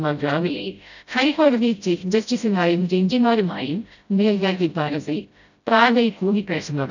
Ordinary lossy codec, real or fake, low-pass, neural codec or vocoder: none; fake; 7.2 kHz; codec, 16 kHz, 0.5 kbps, FreqCodec, smaller model